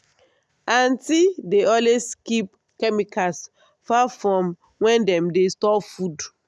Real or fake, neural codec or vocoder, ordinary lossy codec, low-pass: real; none; none; none